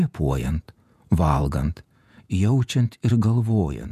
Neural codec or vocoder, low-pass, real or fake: vocoder, 48 kHz, 128 mel bands, Vocos; 14.4 kHz; fake